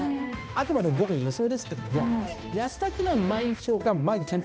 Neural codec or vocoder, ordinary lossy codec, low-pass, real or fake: codec, 16 kHz, 1 kbps, X-Codec, HuBERT features, trained on balanced general audio; none; none; fake